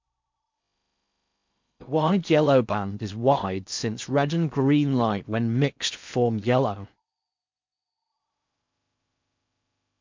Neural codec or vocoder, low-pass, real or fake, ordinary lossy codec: codec, 16 kHz in and 24 kHz out, 0.6 kbps, FocalCodec, streaming, 4096 codes; 7.2 kHz; fake; MP3, 64 kbps